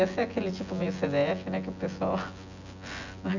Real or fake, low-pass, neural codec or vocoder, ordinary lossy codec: fake; 7.2 kHz; vocoder, 24 kHz, 100 mel bands, Vocos; none